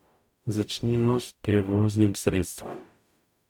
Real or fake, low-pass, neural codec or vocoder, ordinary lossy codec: fake; 19.8 kHz; codec, 44.1 kHz, 0.9 kbps, DAC; none